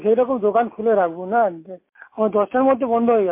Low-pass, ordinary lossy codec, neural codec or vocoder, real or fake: 3.6 kHz; MP3, 24 kbps; none; real